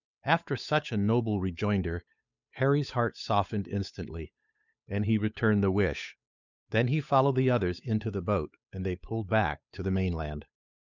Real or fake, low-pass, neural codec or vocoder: fake; 7.2 kHz; codec, 16 kHz, 8 kbps, FunCodec, trained on Chinese and English, 25 frames a second